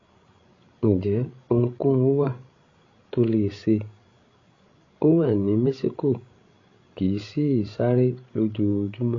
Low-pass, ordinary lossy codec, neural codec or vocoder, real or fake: 7.2 kHz; AAC, 32 kbps; codec, 16 kHz, 16 kbps, FreqCodec, larger model; fake